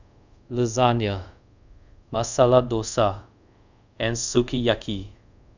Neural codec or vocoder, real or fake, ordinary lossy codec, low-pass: codec, 24 kHz, 0.5 kbps, DualCodec; fake; none; 7.2 kHz